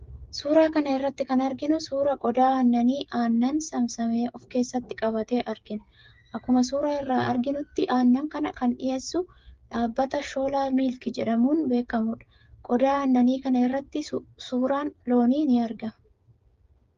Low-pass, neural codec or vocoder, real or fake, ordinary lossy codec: 7.2 kHz; codec, 16 kHz, 8 kbps, FreqCodec, smaller model; fake; Opus, 32 kbps